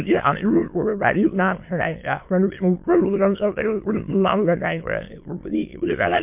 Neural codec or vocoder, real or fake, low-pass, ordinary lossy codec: autoencoder, 22.05 kHz, a latent of 192 numbers a frame, VITS, trained on many speakers; fake; 3.6 kHz; MP3, 32 kbps